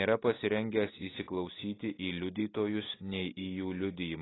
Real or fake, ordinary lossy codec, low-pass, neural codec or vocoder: real; AAC, 16 kbps; 7.2 kHz; none